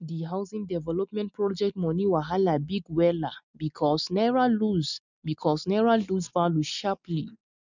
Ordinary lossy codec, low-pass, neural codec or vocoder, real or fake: none; 7.2 kHz; none; real